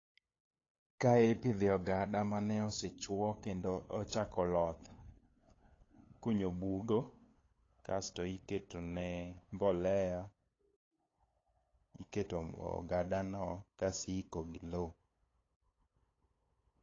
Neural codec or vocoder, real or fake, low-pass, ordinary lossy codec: codec, 16 kHz, 8 kbps, FunCodec, trained on LibriTTS, 25 frames a second; fake; 7.2 kHz; AAC, 32 kbps